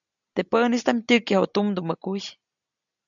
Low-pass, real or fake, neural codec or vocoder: 7.2 kHz; real; none